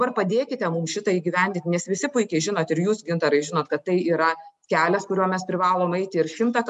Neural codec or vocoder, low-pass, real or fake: none; 10.8 kHz; real